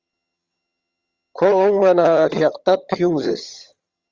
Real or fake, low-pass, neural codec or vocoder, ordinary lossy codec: fake; 7.2 kHz; vocoder, 22.05 kHz, 80 mel bands, HiFi-GAN; Opus, 32 kbps